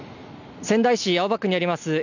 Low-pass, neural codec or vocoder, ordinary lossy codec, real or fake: 7.2 kHz; none; none; real